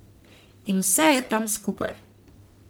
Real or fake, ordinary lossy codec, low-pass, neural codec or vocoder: fake; none; none; codec, 44.1 kHz, 1.7 kbps, Pupu-Codec